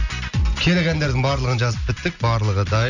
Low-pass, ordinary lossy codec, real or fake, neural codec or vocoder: 7.2 kHz; none; real; none